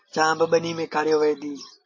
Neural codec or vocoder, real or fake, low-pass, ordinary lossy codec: none; real; 7.2 kHz; MP3, 32 kbps